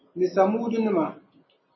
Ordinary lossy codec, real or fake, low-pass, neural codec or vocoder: MP3, 24 kbps; real; 7.2 kHz; none